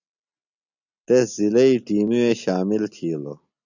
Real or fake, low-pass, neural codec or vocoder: real; 7.2 kHz; none